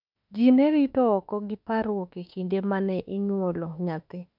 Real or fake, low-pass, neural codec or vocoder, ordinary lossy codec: fake; 5.4 kHz; autoencoder, 48 kHz, 32 numbers a frame, DAC-VAE, trained on Japanese speech; none